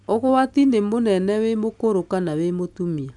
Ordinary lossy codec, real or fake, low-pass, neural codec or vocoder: none; real; 10.8 kHz; none